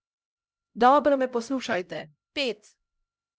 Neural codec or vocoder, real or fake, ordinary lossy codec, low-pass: codec, 16 kHz, 0.5 kbps, X-Codec, HuBERT features, trained on LibriSpeech; fake; none; none